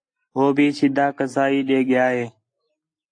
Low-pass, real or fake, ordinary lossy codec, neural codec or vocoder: 9.9 kHz; real; AAC, 48 kbps; none